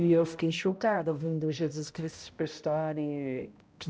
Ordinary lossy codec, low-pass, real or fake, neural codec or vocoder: none; none; fake; codec, 16 kHz, 0.5 kbps, X-Codec, HuBERT features, trained on balanced general audio